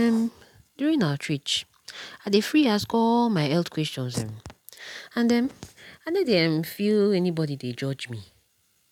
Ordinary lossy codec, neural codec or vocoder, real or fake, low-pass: none; none; real; 19.8 kHz